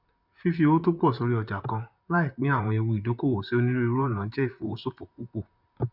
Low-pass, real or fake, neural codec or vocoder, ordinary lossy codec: 5.4 kHz; fake; vocoder, 44.1 kHz, 128 mel bands, Pupu-Vocoder; none